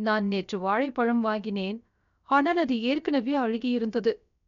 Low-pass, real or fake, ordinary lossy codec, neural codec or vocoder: 7.2 kHz; fake; none; codec, 16 kHz, 0.7 kbps, FocalCodec